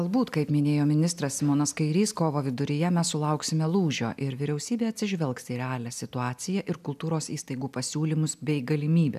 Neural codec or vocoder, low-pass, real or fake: none; 14.4 kHz; real